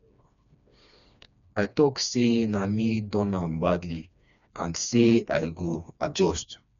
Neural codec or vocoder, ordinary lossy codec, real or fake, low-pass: codec, 16 kHz, 2 kbps, FreqCodec, smaller model; none; fake; 7.2 kHz